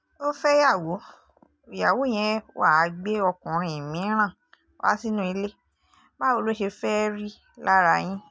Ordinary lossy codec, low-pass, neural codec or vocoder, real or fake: none; none; none; real